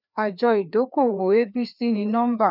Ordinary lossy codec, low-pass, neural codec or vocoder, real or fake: none; 5.4 kHz; codec, 16 kHz, 2 kbps, FreqCodec, larger model; fake